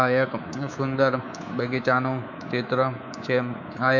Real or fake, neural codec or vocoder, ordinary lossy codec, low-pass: fake; codec, 24 kHz, 3.1 kbps, DualCodec; none; 7.2 kHz